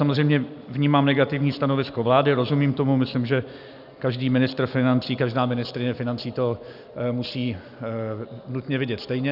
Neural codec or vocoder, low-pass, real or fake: none; 5.4 kHz; real